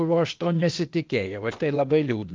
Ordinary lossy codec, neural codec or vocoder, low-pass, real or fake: Opus, 24 kbps; codec, 16 kHz, 0.8 kbps, ZipCodec; 7.2 kHz; fake